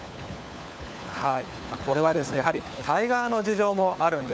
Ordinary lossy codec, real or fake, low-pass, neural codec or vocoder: none; fake; none; codec, 16 kHz, 4 kbps, FunCodec, trained on LibriTTS, 50 frames a second